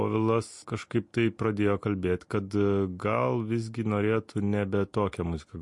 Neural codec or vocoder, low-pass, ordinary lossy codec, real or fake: none; 10.8 kHz; MP3, 48 kbps; real